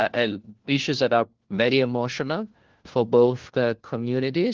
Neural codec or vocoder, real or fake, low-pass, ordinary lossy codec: codec, 16 kHz, 1 kbps, FunCodec, trained on LibriTTS, 50 frames a second; fake; 7.2 kHz; Opus, 16 kbps